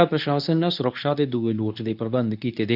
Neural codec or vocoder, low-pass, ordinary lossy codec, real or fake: codec, 24 kHz, 0.9 kbps, WavTokenizer, medium speech release version 2; 5.4 kHz; none; fake